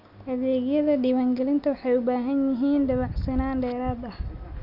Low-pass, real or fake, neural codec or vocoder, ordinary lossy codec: 5.4 kHz; real; none; none